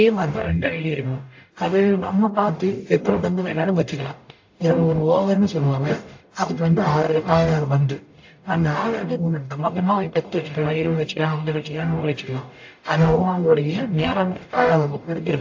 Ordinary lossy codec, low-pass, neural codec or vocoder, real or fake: AAC, 48 kbps; 7.2 kHz; codec, 44.1 kHz, 0.9 kbps, DAC; fake